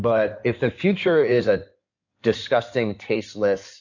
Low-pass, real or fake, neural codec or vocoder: 7.2 kHz; fake; codec, 16 kHz in and 24 kHz out, 2.2 kbps, FireRedTTS-2 codec